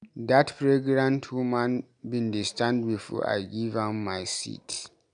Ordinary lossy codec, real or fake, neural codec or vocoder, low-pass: none; real; none; 9.9 kHz